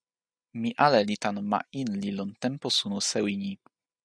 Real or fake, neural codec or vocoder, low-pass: real; none; 9.9 kHz